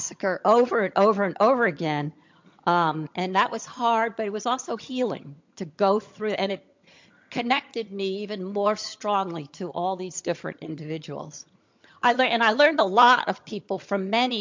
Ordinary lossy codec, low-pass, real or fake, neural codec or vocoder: MP3, 48 kbps; 7.2 kHz; fake; vocoder, 22.05 kHz, 80 mel bands, HiFi-GAN